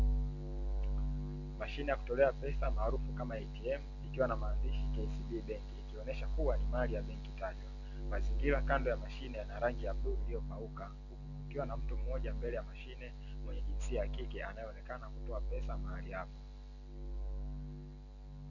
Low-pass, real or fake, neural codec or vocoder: 7.2 kHz; real; none